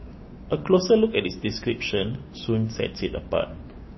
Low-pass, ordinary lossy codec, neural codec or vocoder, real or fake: 7.2 kHz; MP3, 24 kbps; codec, 16 kHz, 6 kbps, DAC; fake